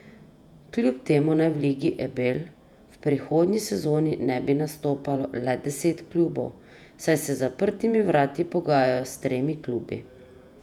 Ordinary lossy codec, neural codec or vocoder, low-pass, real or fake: none; vocoder, 48 kHz, 128 mel bands, Vocos; 19.8 kHz; fake